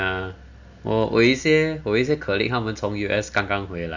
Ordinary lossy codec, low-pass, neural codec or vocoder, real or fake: Opus, 64 kbps; 7.2 kHz; none; real